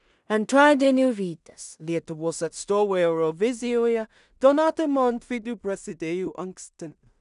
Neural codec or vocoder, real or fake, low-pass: codec, 16 kHz in and 24 kHz out, 0.4 kbps, LongCat-Audio-Codec, two codebook decoder; fake; 10.8 kHz